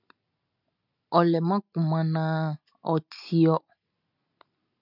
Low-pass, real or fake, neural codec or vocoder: 5.4 kHz; real; none